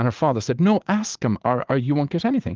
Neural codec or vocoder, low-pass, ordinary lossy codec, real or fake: vocoder, 44.1 kHz, 80 mel bands, Vocos; 7.2 kHz; Opus, 32 kbps; fake